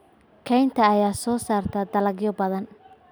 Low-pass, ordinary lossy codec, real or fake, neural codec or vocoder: none; none; real; none